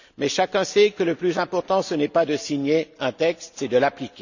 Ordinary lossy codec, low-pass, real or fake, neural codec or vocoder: none; 7.2 kHz; real; none